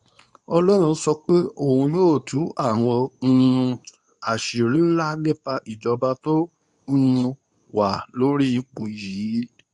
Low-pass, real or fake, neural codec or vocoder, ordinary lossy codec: 10.8 kHz; fake; codec, 24 kHz, 0.9 kbps, WavTokenizer, medium speech release version 2; none